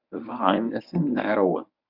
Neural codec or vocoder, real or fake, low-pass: codec, 24 kHz, 0.9 kbps, WavTokenizer, medium speech release version 1; fake; 5.4 kHz